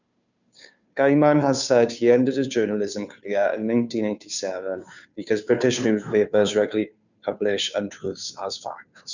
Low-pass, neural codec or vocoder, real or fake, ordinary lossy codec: 7.2 kHz; codec, 16 kHz, 2 kbps, FunCodec, trained on Chinese and English, 25 frames a second; fake; none